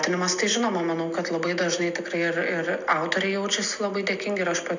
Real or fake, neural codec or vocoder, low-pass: real; none; 7.2 kHz